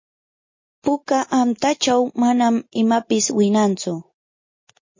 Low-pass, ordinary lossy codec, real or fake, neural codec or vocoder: 7.2 kHz; MP3, 32 kbps; real; none